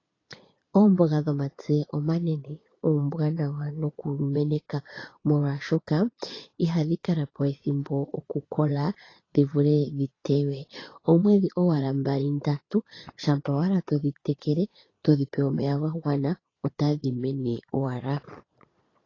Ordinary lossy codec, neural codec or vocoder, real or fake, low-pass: AAC, 32 kbps; vocoder, 22.05 kHz, 80 mel bands, Vocos; fake; 7.2 kHz